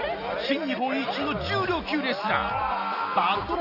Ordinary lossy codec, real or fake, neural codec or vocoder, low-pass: none; real; none; 5.4 kHz